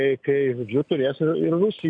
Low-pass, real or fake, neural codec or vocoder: 9.9 kHz; real; none